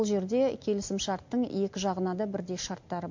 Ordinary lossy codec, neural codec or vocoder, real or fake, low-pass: MP3, 48 kbps; none; real; 7.2 kHz